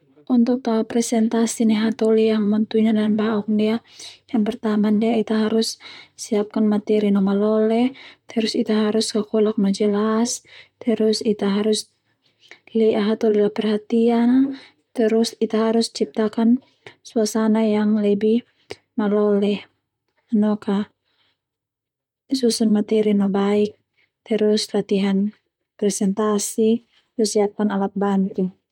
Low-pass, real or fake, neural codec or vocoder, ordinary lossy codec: 19.8 kHz; fake; vocoder, 44.1 kHz, 128 mel bands, Pupu-Vocoder; none